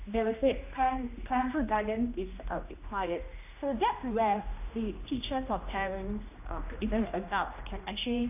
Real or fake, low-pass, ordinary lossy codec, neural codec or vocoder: fake; 3.6 kHz; AAC, 32 kbps; codec, 16 kHz, 1 kbps, X-Codec, HuBERT features, trained on general audio